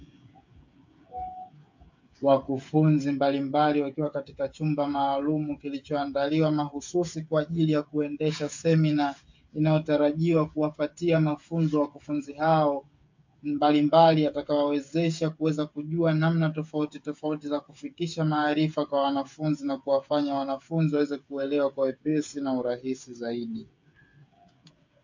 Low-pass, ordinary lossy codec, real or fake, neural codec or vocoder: 7.2 kHz; MP3, 48 kbps; fake; codec, 16 kHz, 8 kbps, FreqCodec, smaller model